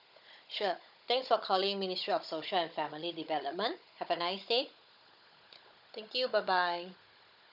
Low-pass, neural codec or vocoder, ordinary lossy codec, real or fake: 5.4 kHz; codec, 16 kHz, 16 kbps, FunCodec, trained on Chinese and English, 50 frames a second; none; fake